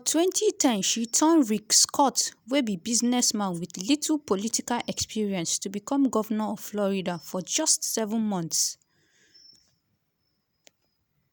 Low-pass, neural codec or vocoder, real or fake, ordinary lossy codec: none; none; real; none